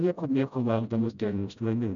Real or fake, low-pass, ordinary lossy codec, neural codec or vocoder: fake; 7.2 kHz; MP3, 96 kbps; codec, 16 kHz, 0.5 kbps, FreqCodec, smaller model